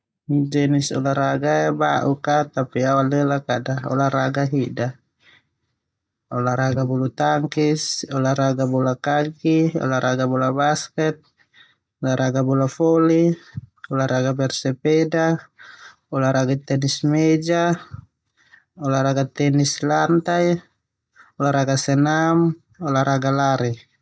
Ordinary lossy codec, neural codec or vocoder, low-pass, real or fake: none; none; none; real